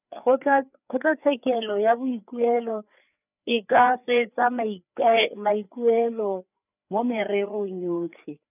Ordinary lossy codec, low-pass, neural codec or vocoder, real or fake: none; 3.6 kHz; codec, 16 kHz, 4 kbps, FreqCodec, larger model; fake